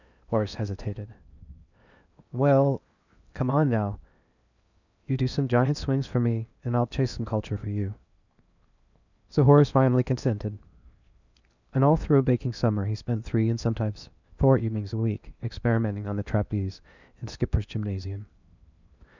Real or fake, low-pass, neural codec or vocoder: fake; 7.2 kHz; codec, 16 kHz in and 24 kHz out, 0.8 kbps, FocalCodec, streaming, 65536 codes